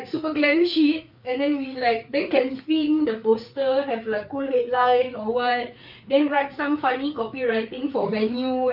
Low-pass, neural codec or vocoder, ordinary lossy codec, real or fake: 5.4 kHz; codec, 16 kHz, 4 kbps, FreqCodec, larger model; none; fake